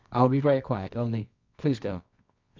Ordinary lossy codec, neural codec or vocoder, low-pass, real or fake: MP3, 64 kbps; codec, 24 kHz, 0.9 kbps, WavTokenizer, medium music audio release; 7.2 kHz; fake